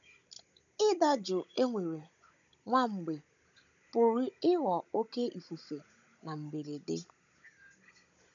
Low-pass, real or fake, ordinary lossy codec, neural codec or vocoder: 7.2 kHz; fake; none; codec, 16 kHz, 16 kbps, FunCodec, trained on Chinese and English, 50 frames a second